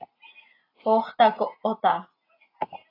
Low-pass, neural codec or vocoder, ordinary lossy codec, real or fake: 5.4 kHz; none; AAC, 24 kbps; real